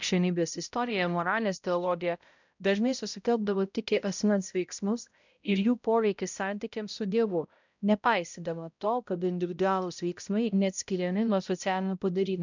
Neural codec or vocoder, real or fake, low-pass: codec, 16 kHz, 0.5 kbps, X-Codec, HuBERT features, trained on balanced general audio; fake; 7.2 kHz